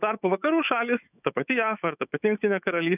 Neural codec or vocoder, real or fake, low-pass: autoencoder, 48 kHz, 128 numbers a frame, DAC-VAE, trained on Japanese speech; fake; 3.6 kHz